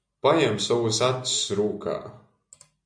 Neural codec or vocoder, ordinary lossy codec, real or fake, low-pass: none; MP3, 64 kbps; real; 9.9 kHz